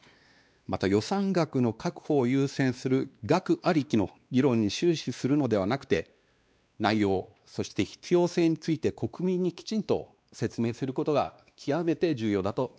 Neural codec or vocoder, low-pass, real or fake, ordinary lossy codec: codec, 16 kHz, 2 kbps, X-Codec, WavLM features, trained on Multilingual LibriSpeech; none; fake; none